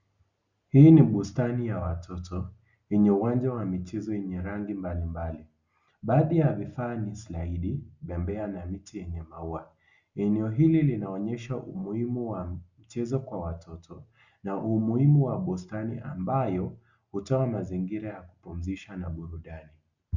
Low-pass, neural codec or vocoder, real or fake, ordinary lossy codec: 7.2 kHz; none; real; Opus, 64 kbps